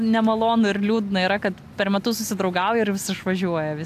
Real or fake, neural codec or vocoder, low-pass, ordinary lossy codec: real; none; 14.4 kHz; AAC, 96 kbps